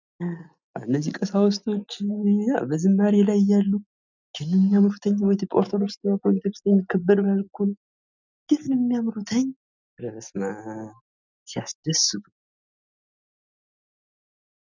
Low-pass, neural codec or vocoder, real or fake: 7.2 kHz; none; real